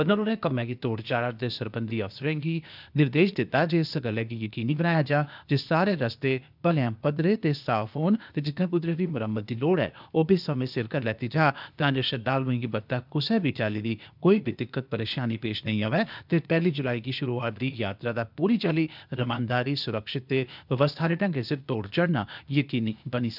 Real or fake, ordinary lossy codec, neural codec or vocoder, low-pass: fake; none; codec, 16 kHz, 0.8 kbps, ZipCodec; 5.4 kHz